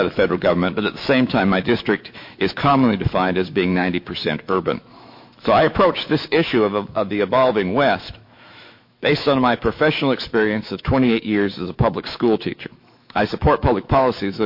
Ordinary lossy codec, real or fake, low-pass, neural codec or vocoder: MP3, 32 kbps; fake; 5.4 kHz; autoencoder, 48 kHz, 128 numbers a frame, DAC-VAE, trained on Japanese speech